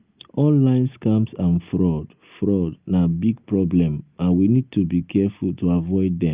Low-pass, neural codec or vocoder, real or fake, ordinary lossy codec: 3.6 kHz; none; real; Opus, 24 kbps